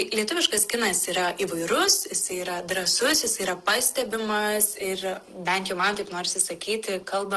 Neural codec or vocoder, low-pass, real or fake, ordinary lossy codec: none; 10.8 kHz; real; Opus, 16 kbps